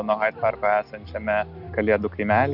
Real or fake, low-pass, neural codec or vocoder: real; 5.4 kHz; none